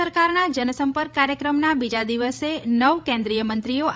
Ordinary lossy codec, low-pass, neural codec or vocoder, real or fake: none; none; codec, 16 kHz, 16 kbps, FreqCodec, larger model; fake